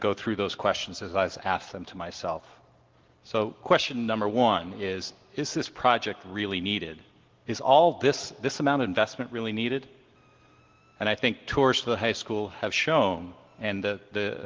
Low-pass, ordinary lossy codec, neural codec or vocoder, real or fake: 7.2 kHz; Opus, 16 kbps; none; real